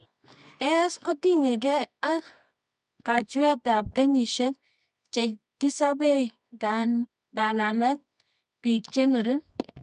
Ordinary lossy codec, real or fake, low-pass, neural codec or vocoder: none; fake; 10.8 kHz; codec, 24 kHz, 0.9 kbps, WavTokenizer, medium music audio release